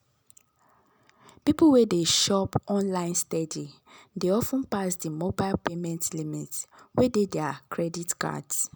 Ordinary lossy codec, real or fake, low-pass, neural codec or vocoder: none; real; none; none